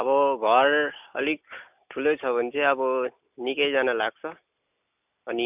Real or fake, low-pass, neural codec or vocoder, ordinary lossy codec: real; 3.6 kHz; none; none